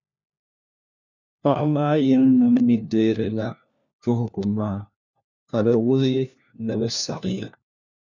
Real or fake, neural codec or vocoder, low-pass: fake; codec, 16 kHz, 1 kbps, FunCodec, trained on LibriTTS, 50 frames a second; 7.2 kHz